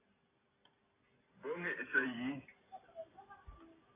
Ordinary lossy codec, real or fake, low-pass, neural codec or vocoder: AAC, 16 kbps; real; 3.6 kHz; none